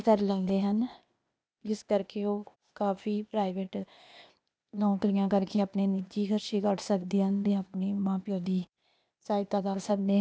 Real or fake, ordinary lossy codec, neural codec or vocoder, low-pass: fake; none; codec, 16 kHz, 0.8 kbps, ZipCodec; none